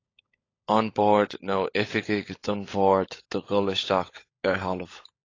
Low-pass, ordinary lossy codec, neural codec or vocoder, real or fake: 7.2 kHz; AAC, 32 kbps; codec, 16 kHz, 16 kbps, FunCodec, trained on LibriTTS, 50 frames a second; fake